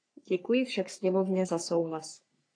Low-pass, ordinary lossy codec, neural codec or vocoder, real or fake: 9.9 kHz; AAC, 48 kbps; codec, 44.1 kHz, 3.4 kbps, Pupu-Codec; fake